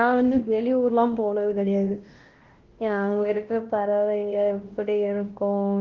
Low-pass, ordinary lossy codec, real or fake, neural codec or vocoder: 7.2 kHz; Opus, 16 kbps; fake; codec, 16 kHz, 1 kbps, X-Codec, WavLM features, trained on Multilingual LibriSpeech